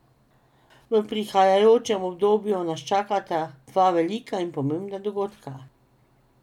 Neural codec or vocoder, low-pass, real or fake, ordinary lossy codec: none; 19.8 kHz; real; none